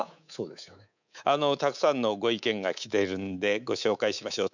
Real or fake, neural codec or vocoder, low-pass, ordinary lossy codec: fake; codec, 24 kHz, 3.1 kbps, DualCodec; 7.2 kHz; none